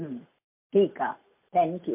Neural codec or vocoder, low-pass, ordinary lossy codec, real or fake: none; 3.6 kHz; MP3, 32 kbps; real